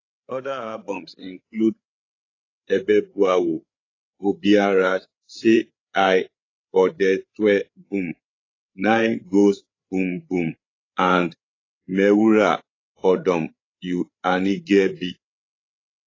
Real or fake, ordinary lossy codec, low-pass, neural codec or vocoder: fake; AAC, 32 kbps; 7.2 kHz; codec, 16 kHz, 8 kbps, FreqCodec, larger model